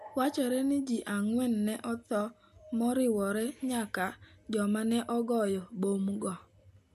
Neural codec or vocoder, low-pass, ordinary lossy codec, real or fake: none; 14.4 kHz; none; real